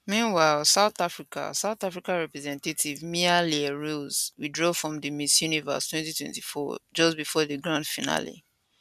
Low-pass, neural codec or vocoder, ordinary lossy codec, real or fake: 14.4 kHz; none; MP3, 96 kbps; real